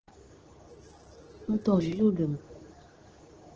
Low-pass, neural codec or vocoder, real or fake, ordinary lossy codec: 7.2 kHz; codec, 24 kHz, 0.9 kbps, WavTokenizer, medium speech release version 2; fake; Opus, 16 kbps